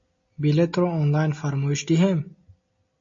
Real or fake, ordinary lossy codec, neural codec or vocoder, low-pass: real; MP3, 32 kbps; none; 7.2 kHz